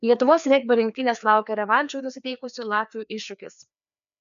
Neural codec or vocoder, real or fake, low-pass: codec, 16 kHz, 2 kbps, FreqCodec, larger model; fake; 7.2 kHz